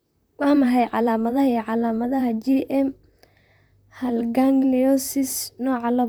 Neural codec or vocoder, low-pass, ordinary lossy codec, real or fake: vocoder, 44.1 kHz, 128 mel bands, Pupu-Vocoder; none; none; fake